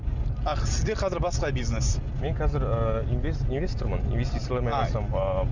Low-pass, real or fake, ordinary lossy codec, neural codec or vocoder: 7.2 kHz; real; none; none